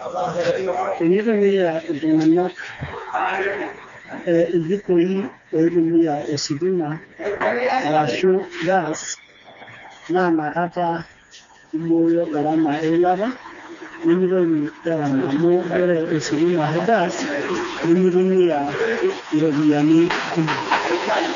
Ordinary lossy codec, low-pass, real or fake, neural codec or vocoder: MP3, 96 kbps; 7.2 kHz; fake; codec, 16 kHz, 2 kbps, FreqCodec, smaller model